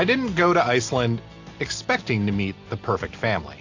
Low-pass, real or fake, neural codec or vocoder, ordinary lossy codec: 7.2 kHz; real; none; AAC, 48 kbps